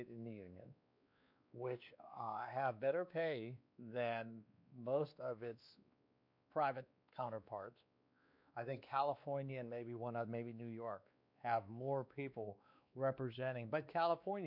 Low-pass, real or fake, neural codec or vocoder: 5.4 kHz; fake; codec, 16 kHz, 2 kbps, X-Codec, WavLM features, trained on Multilingual LibriSpeech